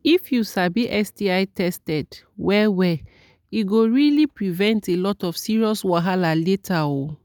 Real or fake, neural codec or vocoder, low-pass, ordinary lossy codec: real; none; 19.8 kHz; none